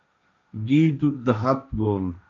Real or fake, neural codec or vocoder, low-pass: fake; codec, 16 kHz, 1.1 kbps, Voila-Tokenizer; 7.2 kHz